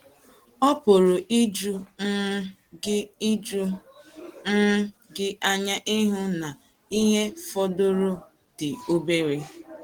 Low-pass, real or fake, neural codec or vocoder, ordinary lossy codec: 19.8 kHz; real; none; Opus, 16 kbps